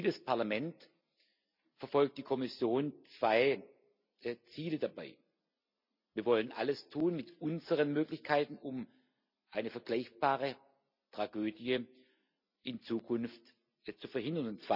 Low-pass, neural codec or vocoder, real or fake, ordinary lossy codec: 5.4 kHz; none; real; none